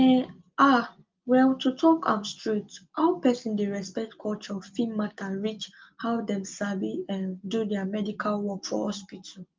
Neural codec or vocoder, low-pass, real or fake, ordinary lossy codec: none; 7.2 kHz; real; Opus, 32 kbps